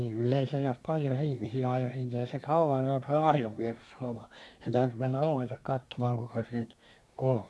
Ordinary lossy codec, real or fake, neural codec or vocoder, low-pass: none; fake; codec, 24 kHz, 1 kbps, SNAC; none